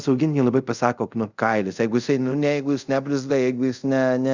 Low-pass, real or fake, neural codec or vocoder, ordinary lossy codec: 7.2 kHz; fake; codec, 24 kHz, 0.5 kbps, DualCodec; Opus, 64 kbps